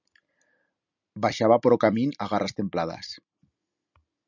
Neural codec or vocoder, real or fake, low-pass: none; real; 7.2 kHz